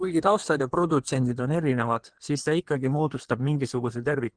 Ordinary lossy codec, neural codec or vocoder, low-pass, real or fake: Opus, 16 kbps; codec, 16 kHz in and 24 kHz out, 1.1 kbps, FireRedTTS-2 codec; 9.9 kHz; fake